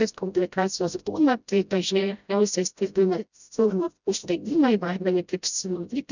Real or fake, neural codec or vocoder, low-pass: fake; codec, 16 kHz, 0.5 kbps, FreqCodec, smaller model; 7.2 kHz